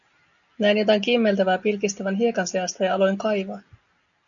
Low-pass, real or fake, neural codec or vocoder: 7.2 kHz; real; none